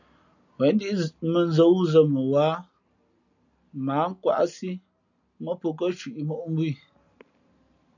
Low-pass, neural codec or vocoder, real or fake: 7.2 kHz; none; real